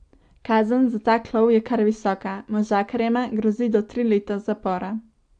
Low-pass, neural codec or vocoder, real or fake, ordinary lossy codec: 9.9 kHz; none; real; AAC, 64 kbps